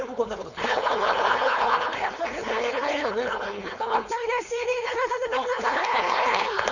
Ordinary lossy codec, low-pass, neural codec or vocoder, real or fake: none; 7.2 kHz; codec, 16 kHz, 4.8 kbps, FACodec; fake